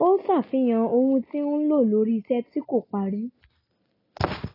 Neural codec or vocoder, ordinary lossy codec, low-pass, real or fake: none; AAC, 24 kbps; 5.4 kHz; real